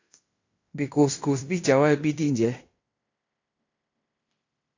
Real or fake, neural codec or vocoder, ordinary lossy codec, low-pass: fake; codec, 16 kHz in and 24 kHz out, 0.9 kbps, LongCat-Audio-Codec, fine tuned four codebook decoder; AAC, 48 kbps; 7.2 kHz